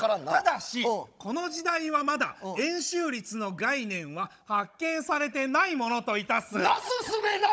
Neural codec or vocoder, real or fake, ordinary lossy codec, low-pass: codec, 16 kHz, 16 kbps, FunCodec, trained on Chinese and English, 50 frames a second; fake; none; none